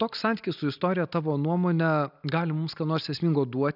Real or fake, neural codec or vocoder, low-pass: real; none; 5.4 kHz